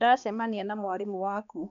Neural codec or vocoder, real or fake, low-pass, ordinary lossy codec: codec, 16 kHz, 2 kbps, X-Codec, HuBERT features, trained on balanced general audio; fake; 7.2 kHz; none